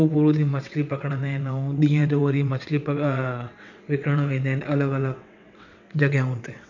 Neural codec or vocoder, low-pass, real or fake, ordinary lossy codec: vocoder, 22.05 kHz, 80 mel bands, WaveNeXt; 7.2 kHz; fake; none